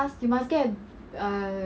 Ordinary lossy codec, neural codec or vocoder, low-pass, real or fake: none; none; none; real